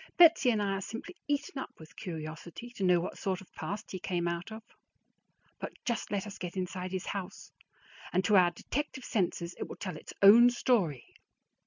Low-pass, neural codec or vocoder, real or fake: 7.2 kHz; none; real